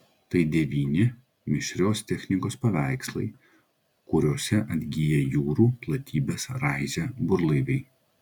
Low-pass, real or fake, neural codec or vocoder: 19.8 kHz; real; none